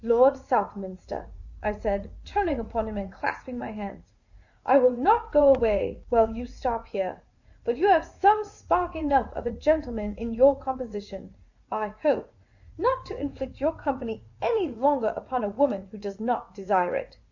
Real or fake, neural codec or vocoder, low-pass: fake; vocoder, 44.1 kHz, 80 mel bands, Vocos; 7.2 kHz